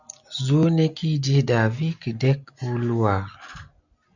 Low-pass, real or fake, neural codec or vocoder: 7.2 kHz; real; none